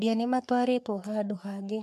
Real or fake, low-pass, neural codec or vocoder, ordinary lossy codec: fake; 14.4 kHz; codec, 44.1 kHz, 3.4 kbps, Pupu-Codec; none